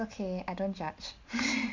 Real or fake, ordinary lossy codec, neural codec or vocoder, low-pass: real; MP3, 48 kbps; none; 7.2 kHz